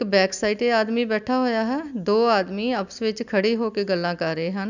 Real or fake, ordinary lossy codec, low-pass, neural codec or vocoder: real; none; 7.2 kHz; none